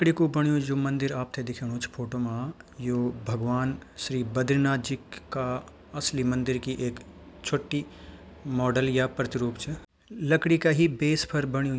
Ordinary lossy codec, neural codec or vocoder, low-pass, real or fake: none; none; none; real